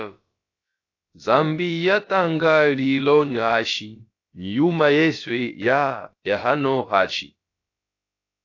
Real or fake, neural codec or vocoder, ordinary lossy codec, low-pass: fake; codec, 16 kHz, about 1 kbps, DyCAST, with the encoder's durations; AAC, 48 kbps; 7.2 kHz